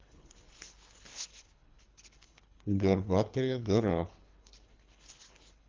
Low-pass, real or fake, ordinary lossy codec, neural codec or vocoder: 7.2 kHz; fake; Opus, 32 kbps; codec, 24 kHz, 3 kbps, HILCodec